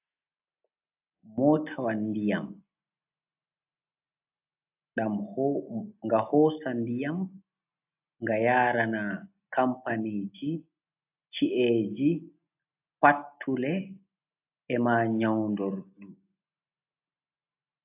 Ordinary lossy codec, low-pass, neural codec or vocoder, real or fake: AAC, 32 kbps; 3.6 kHz; none; real